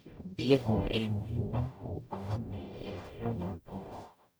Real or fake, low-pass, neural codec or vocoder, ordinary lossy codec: fake; none; codec, 44.1 kHz, 0.9 kbps, DAC; none